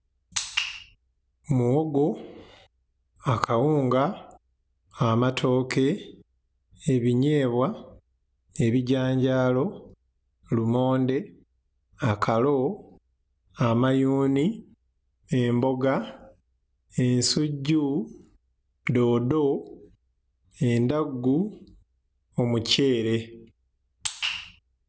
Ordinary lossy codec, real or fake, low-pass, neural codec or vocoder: none; real; none; none